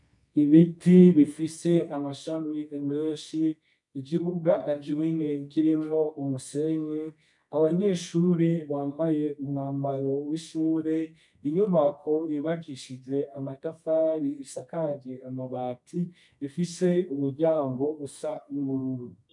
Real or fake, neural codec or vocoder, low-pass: fake; codec, 24 kHz, 0.9 kbps, WavTokenizer, medium music audio release; 10.8 kHz